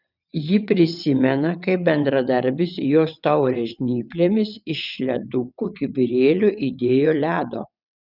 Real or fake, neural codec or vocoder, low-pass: fake; vocoder, 22.05 kHz, 80 mel bands, WaveNeXt; 5.4 kHz